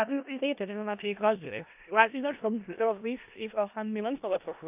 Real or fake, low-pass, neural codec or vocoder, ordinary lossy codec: fake; 3.6 kHz; codec, 16 kHz in and 24 kHz out, 0.4 kbps, LongCat-Audio-Codec, four codebook decoder; AAC, 32 kbps